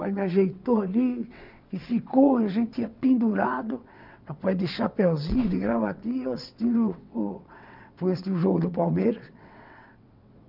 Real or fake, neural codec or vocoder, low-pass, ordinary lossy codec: fake; vocoder, 22.05 kHz, 80 mel bands, WaveNeXt; 5.4 kHz; none